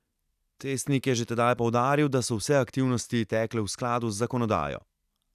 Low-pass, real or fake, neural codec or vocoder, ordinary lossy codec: 14.4 kHz; real; none; none